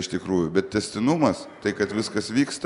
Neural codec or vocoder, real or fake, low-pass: none; real; 10.8 kHz